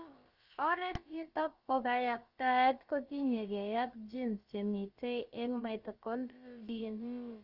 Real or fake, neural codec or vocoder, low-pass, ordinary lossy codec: fake; codec, 16 kHz, about 1 kbps, DyCAST, with the encoder's durations; 5.4 kHz; Opus, 24 kbps